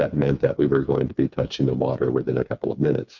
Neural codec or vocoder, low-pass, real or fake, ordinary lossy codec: codec, 16 kHz, 4 kbps, FreqCodec, smaller model; 7.2 kHz; fake; MP3, 64 kbps